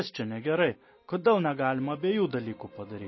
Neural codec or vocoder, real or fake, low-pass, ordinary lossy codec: none; real; 7.2 kHz; MP3, 24 kbps